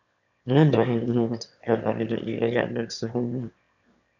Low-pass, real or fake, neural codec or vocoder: 7.2 kHz; fake; autoencoder, 22.05 kHz, a latent of 192 numbers a frame, VITS, trained on one speaker